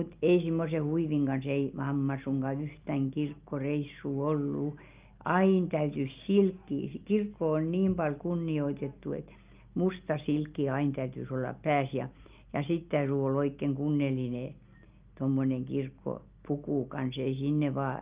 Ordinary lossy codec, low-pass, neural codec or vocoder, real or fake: Opus, 24 kbps; 3.6 kHz; none; real